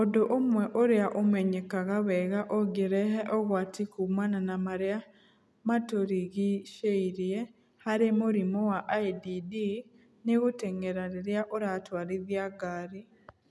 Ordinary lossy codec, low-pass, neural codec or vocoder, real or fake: none; none; none; real